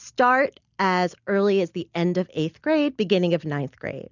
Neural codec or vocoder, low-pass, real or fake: none; 7.2 kHz; real